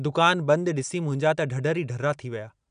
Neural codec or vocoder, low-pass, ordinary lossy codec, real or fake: none; 9.9 kHz; none; real